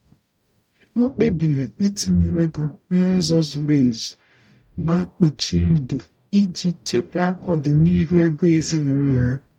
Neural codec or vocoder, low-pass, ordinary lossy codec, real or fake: codec, 44.1 kHz, 0.9 kbps, DAC; 19.8 kHz; MP3, 96 kbps; fake